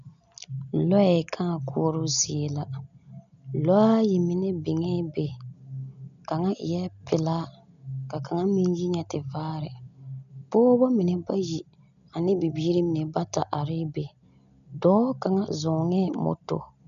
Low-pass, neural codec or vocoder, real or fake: 7.2 kHz; none; real